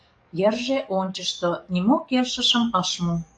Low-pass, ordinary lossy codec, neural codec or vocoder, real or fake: 9.9 kHz; AAC, 64 kbps; codec, 44.1 kHz, 7.8 kbps, DAC; fake